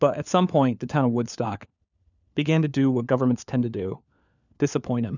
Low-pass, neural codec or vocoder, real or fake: 7.2 kHz; codec, 16 kHz, 4 kbps, FunCodec, trained on LibriTTS, 50 frames a second; fake